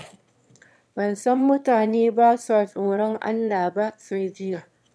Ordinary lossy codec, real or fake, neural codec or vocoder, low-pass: none; fake; autoencoder, 22.05 kHz, a latent of 192 numbers a frame, VITS, trained on one speaker; none